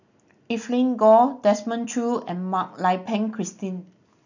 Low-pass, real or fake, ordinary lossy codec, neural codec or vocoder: 7.2 kHz; real; none; none